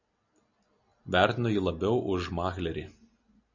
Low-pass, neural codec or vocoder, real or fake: 7.2 kHz; none; real